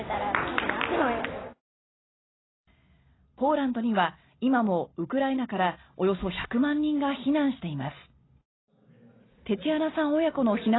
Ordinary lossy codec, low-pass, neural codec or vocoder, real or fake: AAC, 16 kbps; 7.2 kHz; none; real